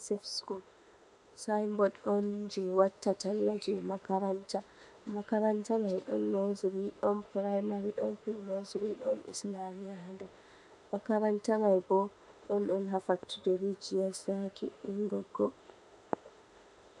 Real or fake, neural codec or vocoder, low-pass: fake; autoencoder, 48 kHz, 32 numbers a frame, DAC-VAE, trained on Japanese speech; 10.8 kHz